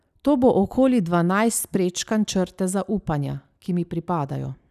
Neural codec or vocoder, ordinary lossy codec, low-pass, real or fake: none; none; 14.4 kHz; real